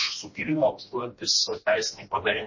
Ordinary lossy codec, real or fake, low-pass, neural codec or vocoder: MP3, 32 kbps; fake; 7.2 kHz; codec, 16 kHz, 1 kbps, FreqCodec, smaller model